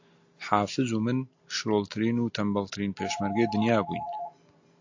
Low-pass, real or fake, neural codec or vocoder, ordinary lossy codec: 7.2 kHz; real; none; AAC, 48 kbps